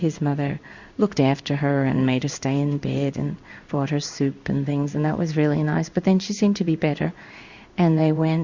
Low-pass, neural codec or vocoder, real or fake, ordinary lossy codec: 7.2 kHz; codec, 16 kHz in and 24 kHz out, 1 kbps, XY-Tokenizer; fake; Opus, 64 kbps